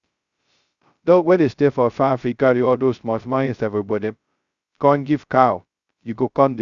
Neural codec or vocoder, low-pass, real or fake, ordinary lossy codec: codec, 16 kHz, 0.2 kbps, FocalCodec; 7.2 kHz; fake; Opus, 64 kbps